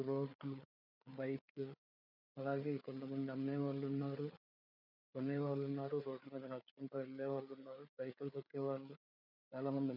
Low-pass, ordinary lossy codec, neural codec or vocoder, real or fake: 5.4 kHz; none; codec, 16 kHz, 4 kbps, FreqCodec, larger model; fake